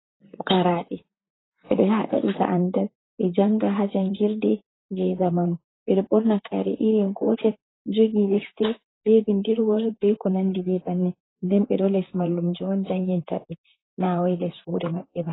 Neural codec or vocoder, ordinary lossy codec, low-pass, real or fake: vocoder, 44.1 kHz, 128 mel bands, Pupu-Vocoder; AAC, 16 kbps; 7.2 kHz; fake